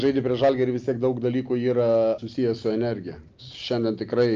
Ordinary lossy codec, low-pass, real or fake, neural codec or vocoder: Opus, 24 kbps; 7.2 kHz; real; none